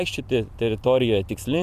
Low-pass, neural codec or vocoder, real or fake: 14.4 kHz; vocoder, 44.1 kHz, 128 mel bands every 256 samples, BigVGAN v2; fake